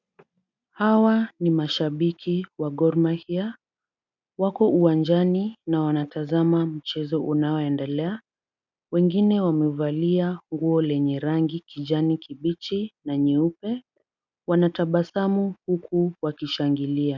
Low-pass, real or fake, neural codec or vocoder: 7.2 kHz; real; none